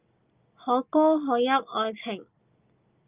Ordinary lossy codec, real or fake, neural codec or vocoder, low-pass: Opus, 24 kbps; real; none; 3.6 kHz